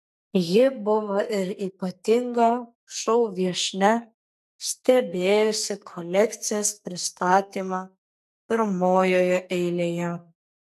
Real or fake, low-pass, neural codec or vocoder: fake; 14.4 kHz; codec, 44.1 kHz, 2.6 kbps, SNAC